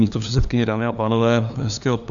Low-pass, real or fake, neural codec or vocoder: 7.2 kHz; fake; codec, 16 kHz, 2 kbps, FunCodec, trained on LibriTTS, 25 frames a second